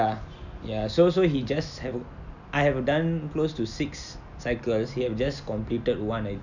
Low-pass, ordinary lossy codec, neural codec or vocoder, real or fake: 7.2 kHz; none; none; real